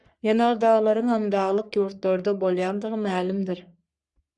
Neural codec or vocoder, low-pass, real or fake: codec, 44.1 kHz, 3.4 kbps, Pupu-Codec; 10.8 kHz; fake